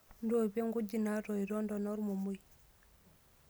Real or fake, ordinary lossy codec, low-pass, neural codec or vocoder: real; none; none; none